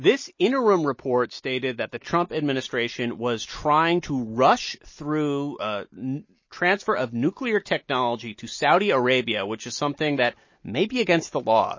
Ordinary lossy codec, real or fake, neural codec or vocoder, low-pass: MP3, 32 kbps; real; none; 7.2 kHz